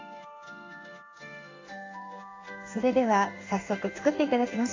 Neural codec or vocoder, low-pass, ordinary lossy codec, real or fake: codec, 16 kHz in and 24 kHz out, 1 kbps, XY-Tokenizer; 7.2 kHz; AAC, 32 kbps; fake